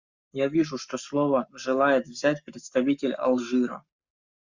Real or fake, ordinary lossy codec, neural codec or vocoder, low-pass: fake; Opus, 64 kbps; codec, 44.1 kHz, 7.8 kbps, Pupu-Codec; 7.2 kHz